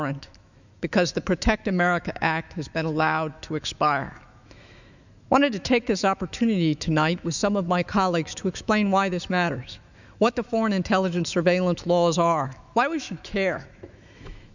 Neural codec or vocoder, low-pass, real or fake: autoencoder, 48 kHz, 128 numbers a frame, DAC-VAE, trained on Japanese speech; 7.2 kHz; fake